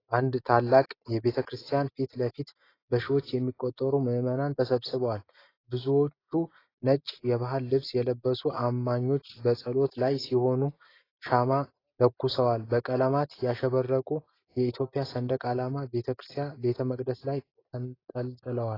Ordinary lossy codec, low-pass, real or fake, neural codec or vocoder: AAC, 24 kbps; 5.4 kHz; real; none